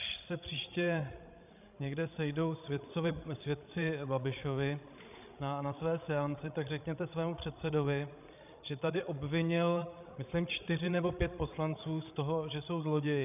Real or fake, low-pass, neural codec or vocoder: fake; 3.6 kHz; codec, 16 kHz, 16 kbps, FreqCodec, larger model